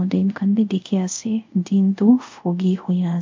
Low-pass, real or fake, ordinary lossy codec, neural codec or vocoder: 7.2 kHz; fake; MP3, 48 kbps; codec, 24 kHz, 0.5 kbps, DualCodec